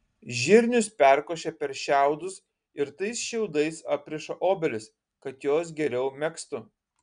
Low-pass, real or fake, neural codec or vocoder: 9.9 kHz; real; none